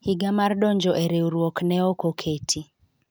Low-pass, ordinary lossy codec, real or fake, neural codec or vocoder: none; none; real; none